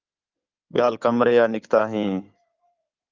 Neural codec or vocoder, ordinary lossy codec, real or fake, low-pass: codec, 16 kHz in and 24 kHz out, 2.2 kbps, FireRedTTS-2 codec; Opus, 32 kbps; fake; 7.2 kHz